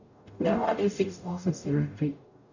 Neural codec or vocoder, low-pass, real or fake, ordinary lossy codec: codec, 44.1 kHz, 0.9 kbps, DAC; 7.2 kHz; fake; none